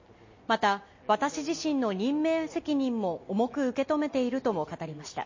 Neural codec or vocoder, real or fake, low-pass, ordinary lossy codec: none; real; 7.2 kHz; MP3, 32 kbps